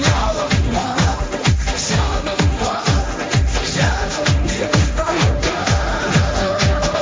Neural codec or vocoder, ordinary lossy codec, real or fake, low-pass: codec, 16 kHz, 1.1 kbps, Voila-Tokenizer; none; fake; none